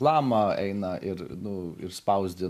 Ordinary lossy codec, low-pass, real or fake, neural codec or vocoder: MP3, 96 kbps; 14.4 kHz; real; none